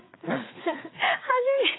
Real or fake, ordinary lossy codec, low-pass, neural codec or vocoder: fake; AAC, 16 kbps; 7.2 kHz; codec, 16 kHz, 4 kbps, FreqCodec, larger model